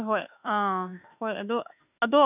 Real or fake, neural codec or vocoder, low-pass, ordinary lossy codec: fake; autoencoder, 48 kHz, 32 numbers a frame, DAC-VAE, trained on Japanese speech; 3.6 kHz; none